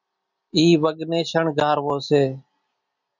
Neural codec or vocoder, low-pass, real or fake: none; 7.2 kHz; real